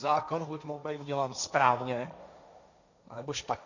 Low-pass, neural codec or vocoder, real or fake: 7.2 kHz; codec, 16 kHz, 1.1 kbps, Voila-Tokenizer; fake